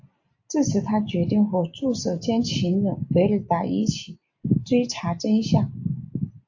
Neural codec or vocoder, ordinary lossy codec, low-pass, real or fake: none; AAC, 48 kbps; 7.2 kHz; real